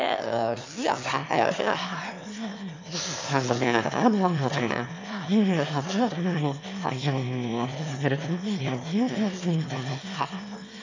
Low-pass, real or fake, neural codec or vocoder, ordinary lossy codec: 7.2 kHz; fake; autoencoder, 22.05 kHz, a latent of 192 numbers a frame, VITS, trained on one speaker; MP3, 64 kbps